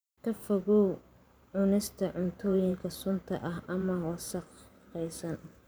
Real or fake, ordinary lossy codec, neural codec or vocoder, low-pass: fake; none; vocoder, 44.1 kHz, 128 mel bands every 512 samples, BigVGAN v2; none